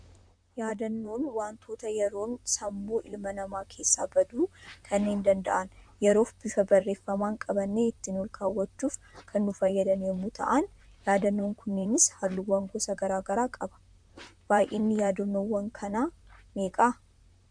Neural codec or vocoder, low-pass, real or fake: vocoder, 44.1 kHz, 128 mel bands, Pupu-Vocoder; 9.9 kHz; fake